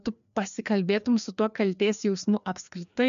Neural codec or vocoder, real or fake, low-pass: codec, 16 kHz, 2 kbps, FreqCodec, larger model; fake; 7.2 kHz